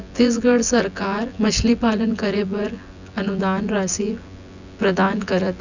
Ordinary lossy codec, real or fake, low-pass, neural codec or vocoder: none; fake; 7.2 kHz; vocoder, 24 kHz, 100 mel bands, Vocos